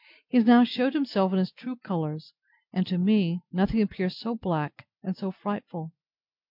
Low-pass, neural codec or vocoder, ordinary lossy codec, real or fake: 5.4 kHz; none; MP3, 48 kbps; real